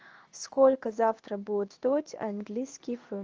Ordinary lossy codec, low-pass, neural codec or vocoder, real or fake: Opus, 24 kbps; 7.2 kHz; codec, 16 kHz in and 24 kHz out, 1 kbps, XY-Tokenizer; fake